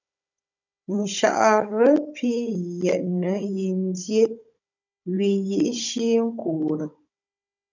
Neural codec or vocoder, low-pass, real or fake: codec, 16 kHz, 16 kbps, FunCodec, trained on Chinese and English, 50 frames a second; 7.2 kHz; fake